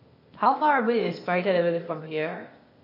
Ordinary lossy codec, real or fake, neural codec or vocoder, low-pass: MP3, 32 kbps; fake; codec, 16 kHz, 0.8 kbps, ZipCodec; 5.4 kHz